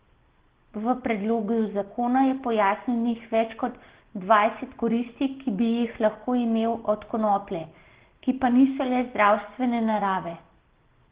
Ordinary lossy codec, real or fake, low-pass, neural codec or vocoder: Opus, 16 kbps; real; 3.6 kHz; none